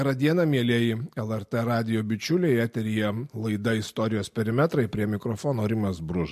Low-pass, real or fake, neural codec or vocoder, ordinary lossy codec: 14.4 kHz; real; none; MP3, 64 kbps